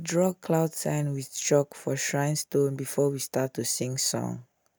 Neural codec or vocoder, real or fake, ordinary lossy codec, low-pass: none; real; none; none